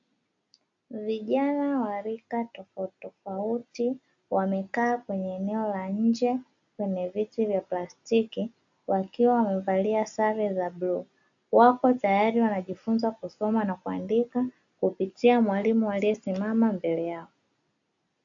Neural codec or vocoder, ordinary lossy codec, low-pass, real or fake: none; AAC, 64 kbps; 7.2 kHz; real